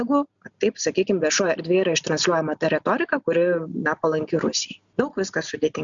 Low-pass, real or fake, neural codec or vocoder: 7.2 kHz; real; none